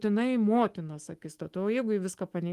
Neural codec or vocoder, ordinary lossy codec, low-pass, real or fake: autoencoder, 48 kHz, 128 numbers a frame, DAC-VAE, trained on Japanese speech; Opus, 24 kbps; 14.4 kHz; fake